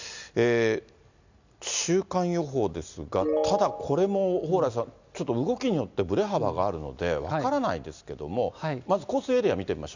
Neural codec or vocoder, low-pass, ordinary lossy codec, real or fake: none; 7.2 kHz; none; real